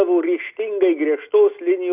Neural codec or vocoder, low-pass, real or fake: none; 3.6 kHz; real